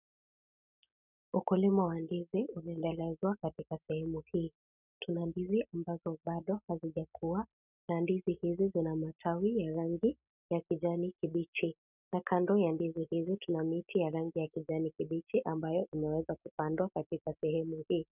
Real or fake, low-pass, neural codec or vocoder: real; 3.6 kHz; none